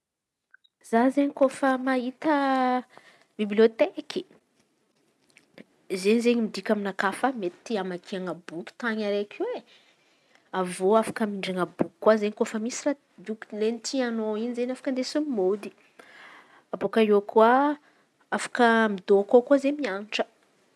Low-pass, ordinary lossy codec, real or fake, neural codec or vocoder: none; none; real; none